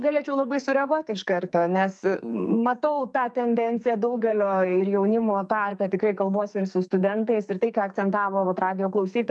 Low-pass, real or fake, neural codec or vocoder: 10.8 kHz; fake; codec, 44.1 kHz, 2.6 kbps, SNAC